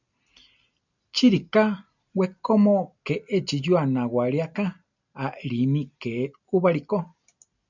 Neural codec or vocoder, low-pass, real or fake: none; 7.2 kHz; real